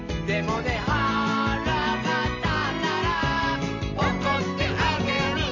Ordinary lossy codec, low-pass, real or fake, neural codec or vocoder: AAC, 48 kbps; 7.2 kHz; real; none